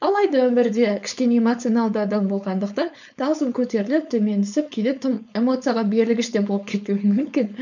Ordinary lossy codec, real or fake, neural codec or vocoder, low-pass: none; fake; codec, 16 kHz, 4.8 kbps, FACodec; 7.2 kHz